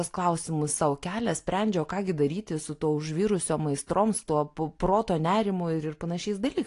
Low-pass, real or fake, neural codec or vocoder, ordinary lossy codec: 10.8 kHz; real; none; AAC, 48 kbps